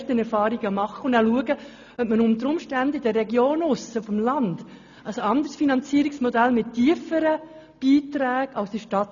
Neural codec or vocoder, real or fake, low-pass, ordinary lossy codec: none; real; 7.2 kHz; none